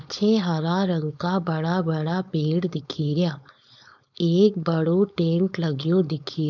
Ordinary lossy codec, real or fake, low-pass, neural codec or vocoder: none; fake; 7.2 kHz; codec, 16 kHz, 4.8 kbps, FACodec